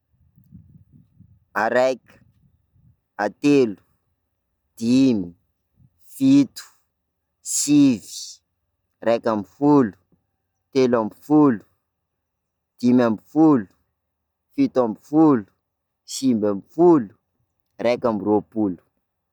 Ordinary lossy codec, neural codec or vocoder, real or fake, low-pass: none; none; real; 19.8 kHz